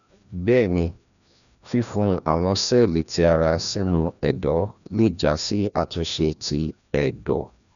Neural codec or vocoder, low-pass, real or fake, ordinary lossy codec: codec, 16 kHz, 1 kbps, FreqCodec, larger model; 7.2 kHz; fake; none